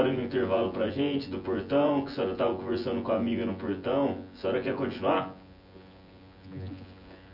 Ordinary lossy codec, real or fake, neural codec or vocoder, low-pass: none; fake; vocoder, 24 kHz, 100 mel bands, Vocos; 5.4 kHz